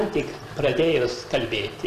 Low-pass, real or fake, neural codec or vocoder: 14.4 kHz; real; none